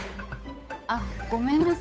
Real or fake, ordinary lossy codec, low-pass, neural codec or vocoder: fake; none; none; codec, 16 kHz, 8 kbps, FunCodec, trained on Chinese and English, 25 frames a second